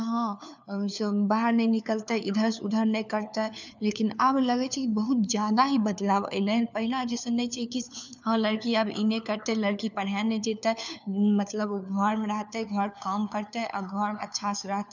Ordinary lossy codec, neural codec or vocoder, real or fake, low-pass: none; codec, 16 kHz, 4 kbps, FunCodec, trained on LibriTTS, 50 frames a second; fake; 7.2 kHz